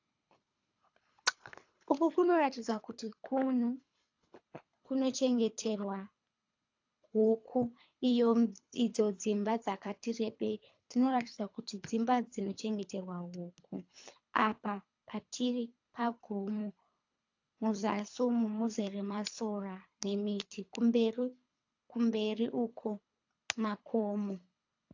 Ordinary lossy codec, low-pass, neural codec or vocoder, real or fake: AAC, 48 kbps; 7.2 kHz; codec, 24 kHz, 3 kbps, HILCodec; fake